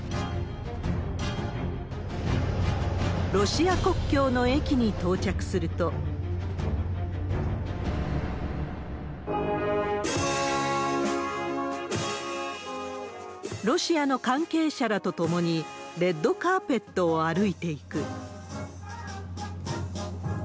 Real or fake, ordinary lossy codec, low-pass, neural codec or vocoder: real; none; none; none